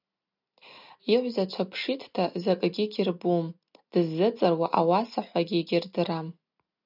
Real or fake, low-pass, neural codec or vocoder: real; 5.4 kHz; none